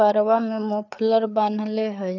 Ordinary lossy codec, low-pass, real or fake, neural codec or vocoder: none; 7.2 kHz; fake; codec, 16 kHz, 4 kbps, FreqCodec, larger model